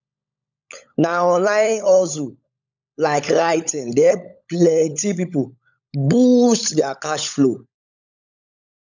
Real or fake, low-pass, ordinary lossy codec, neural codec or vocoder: fake; 7.2 kHz; none; codec, 16 kHz, 16 kbps, FunCodec, trained on LibriTTS, 50 frames a second